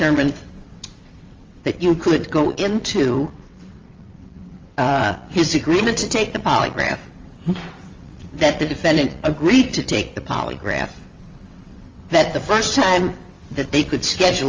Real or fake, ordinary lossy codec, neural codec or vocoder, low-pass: fake; Opus, 32 kbps; vocoder, 22.05 kHz, 80 mel bands, WaveNeXt; 7.2 kHz